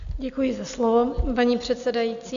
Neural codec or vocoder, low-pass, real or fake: none; 7.2 kHz; real